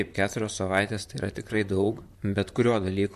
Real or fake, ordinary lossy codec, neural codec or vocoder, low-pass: fake; MP3, 64 kbps; vocoder, 44.1 kHz, 128 mel bands, Pupu-Vocoder; 14.4 kHz